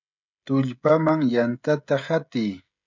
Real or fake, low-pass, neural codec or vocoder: fake; 7.2 kHz; codec, 16 kHz, 16 kbps, FreqCodec, smaller model